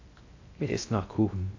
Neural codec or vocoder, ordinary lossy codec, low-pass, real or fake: codec, 16 kHz in and 24 kHz out, 0.6 kbps, FocalCodec, streaming, 2048 codes; AAC, 48 kbps; 7.2 kHz; fake